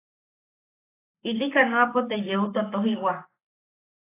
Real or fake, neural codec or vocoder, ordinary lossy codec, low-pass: fake; codec, 44.1 kHz, 7.8 kbps, Pupu-Codec; AAC, 24 kbps; 3.6 kHz